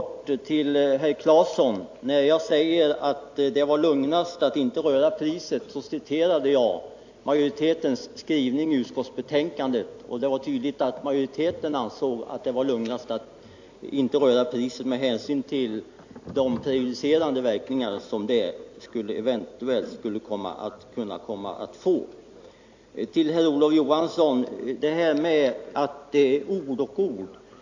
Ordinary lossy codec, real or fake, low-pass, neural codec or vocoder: AAC, 48 kbps; real; 7.2 kHz; none